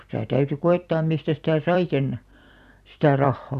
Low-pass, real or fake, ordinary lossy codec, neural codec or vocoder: 14.4 kHz; fake; none; vocoder, 44.1 kHz, 128 mel bands every 256 samples, BigVGAN v2